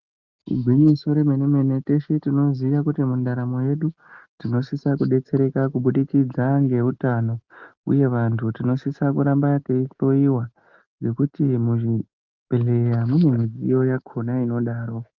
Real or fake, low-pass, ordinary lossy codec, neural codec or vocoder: real; 7.2 kHz; Opus, 32 kbps; none